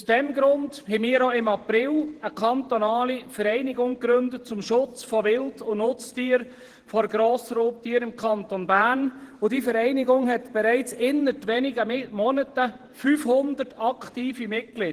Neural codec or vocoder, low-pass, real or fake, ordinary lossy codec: vocoder, 48 kHz, 128 mel bands, Vocos; 14.4 kHz; fake; Opus, 16 kbps